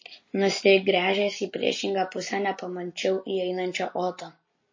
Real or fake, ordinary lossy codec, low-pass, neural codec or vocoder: real; MP3, 32 kbps; 7.2 kHz; none